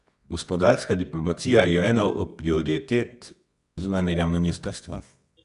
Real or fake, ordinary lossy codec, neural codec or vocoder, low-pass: fake; none; codec, 24 kHz, 0.9 kbps, WavTokenizer, medium music audio release; 10.8 kHz